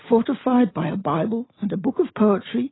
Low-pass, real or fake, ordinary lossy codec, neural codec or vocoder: 7.2 kHz; real; AAC, 16 kbps; none